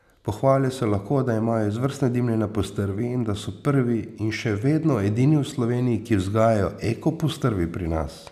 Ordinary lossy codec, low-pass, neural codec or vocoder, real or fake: none; 14.4 kHz; none; real